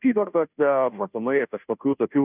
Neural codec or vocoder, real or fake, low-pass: codec, 16 kHz, 0.5 kbps, FunCodec, trained on Chinese and English, 25 frames a second; fake; 3.6 kHz